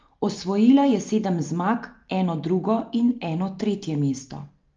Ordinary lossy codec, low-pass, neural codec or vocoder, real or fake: Opus, 24 kbps; 7.2 kHz; none; real